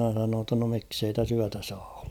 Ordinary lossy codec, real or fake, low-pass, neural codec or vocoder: none; fake; 19.8 kHz; vocoder, 44.1 kHz, 128 mel bands every 256 samples, BigVGAN v2